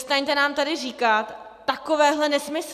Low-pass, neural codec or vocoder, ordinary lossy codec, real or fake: 14.4 kHz; none; AAC, 96 kbps; real